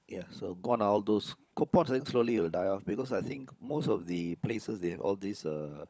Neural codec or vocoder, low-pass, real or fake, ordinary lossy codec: codec, 16 kHz, 16 kbps, FunCodec, trained on LibriTTS, 50 frames a second; none; fake; none